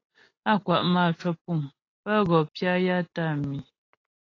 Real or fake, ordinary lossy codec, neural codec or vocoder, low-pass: real; AAC, 32 kbps; none; 7.2 kHz